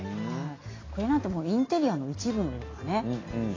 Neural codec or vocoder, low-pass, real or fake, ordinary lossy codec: none; 7.2 kHz; real; AAC, 32 kbps